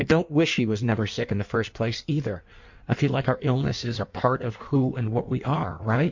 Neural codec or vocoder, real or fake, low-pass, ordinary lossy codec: codec, 16 kHz in and 24 kHz out, 1.1 kbps, FireRedTTS-2 codec; fake; 7.2 kHz; MP3, 48 kbps